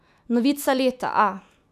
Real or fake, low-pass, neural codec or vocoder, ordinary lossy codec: fake; 14.4 kHz; autoencoder, 48 kHz, 128 numbers a frame, DAC-VAE, trained on Japanese speech; none